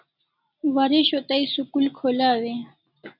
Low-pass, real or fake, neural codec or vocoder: 5.4 kHz; real; none